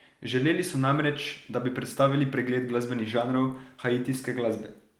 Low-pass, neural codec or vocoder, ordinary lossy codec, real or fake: 19.8 kHz; none; Opus, 24 kbps; real